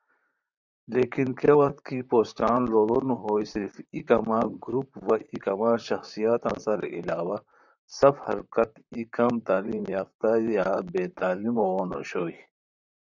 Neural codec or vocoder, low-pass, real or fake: vocoder, 44.1 kHz, 128 mel bands, Pupu-Vocoder; 7.2 kHz; fake